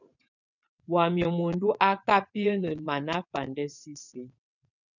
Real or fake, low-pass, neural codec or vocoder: fake; 7.2 kHz; vocoder, 22.05 kHz, 80 mel bands, WaveNeXt